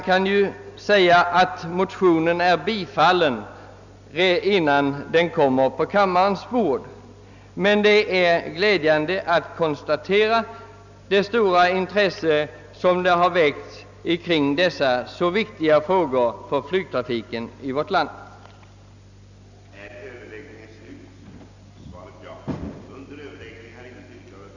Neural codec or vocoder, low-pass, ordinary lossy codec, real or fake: none; 7.2 kHz; none; real